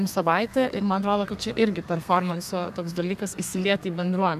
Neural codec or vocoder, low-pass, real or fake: codec, 32 kHz, 1.9 kbps, SNAC; 14.4 kHz; fake